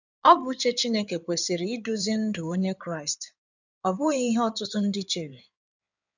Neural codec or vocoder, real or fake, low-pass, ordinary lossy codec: codec, 16 kHz in and 24 kHz out, 2.2 kbps, FireRedTTS-2 codec; fake; 7.2 kHz; none